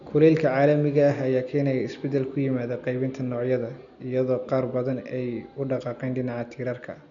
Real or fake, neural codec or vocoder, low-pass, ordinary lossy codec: real; none; 7.2 kHz; none